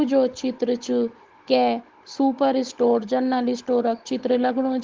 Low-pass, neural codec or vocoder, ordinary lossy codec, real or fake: 7.2 kHz; codec, 16 kHz, 16 kbps, FunCodec, trained on Chinese and English, 50 frames a second; Opus, 16 kbps; fake